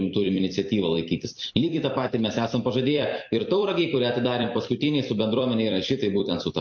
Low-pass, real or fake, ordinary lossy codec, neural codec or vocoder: 7.2 kHz; fake; MP3, 48 kbps; vocoder, 44.1 kHz, 128 mel bands every 256 samples, BigVGAN v2